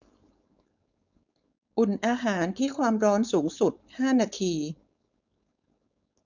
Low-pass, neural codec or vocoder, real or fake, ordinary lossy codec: 7.2 kHz; codec, 16 kHz, 4.8 kbps, FACodec; fake; none